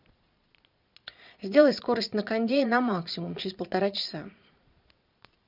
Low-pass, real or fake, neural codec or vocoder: 5.4 kHz; fake; vocoder, 22.05 kHz, 80 mel bands, Vocos